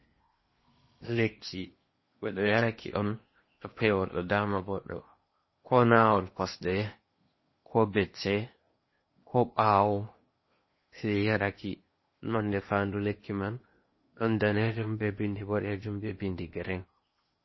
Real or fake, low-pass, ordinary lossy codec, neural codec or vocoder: fake; 7.2 kHz; MP3, 24 kbps; codec, 16 kHz in and 24 kHz out, 0.8 kbps, FocalCodec, streaming, 65536 codes